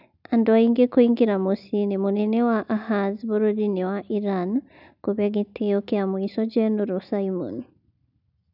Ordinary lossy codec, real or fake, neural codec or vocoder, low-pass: none; real; none; 5.4 kHz